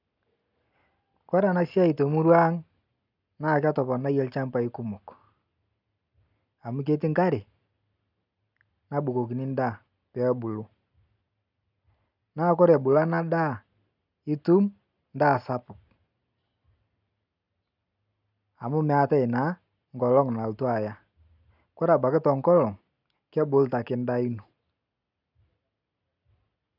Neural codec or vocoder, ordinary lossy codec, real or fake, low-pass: none; none; real; 5.4 kHz